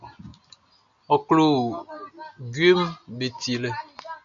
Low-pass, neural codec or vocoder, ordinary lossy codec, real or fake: 7.2 kHz; none; MP3, 96 kbps; real